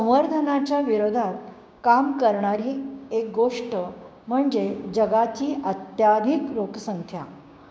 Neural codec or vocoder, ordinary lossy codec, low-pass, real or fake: codec, 16 kHz, 6 kbps, DAC; none; none; fake